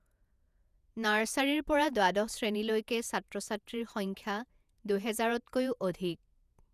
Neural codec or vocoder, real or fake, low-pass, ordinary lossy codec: vocoder, 48 kHz, 128 mel bands, Vocos; fake; 14.4 kHz; none